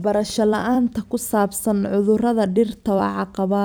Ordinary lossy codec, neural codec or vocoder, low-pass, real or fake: none; vocoder, 44.1 kHz, 128 mel bands every 256 samples, BigVGAN v2; none; fake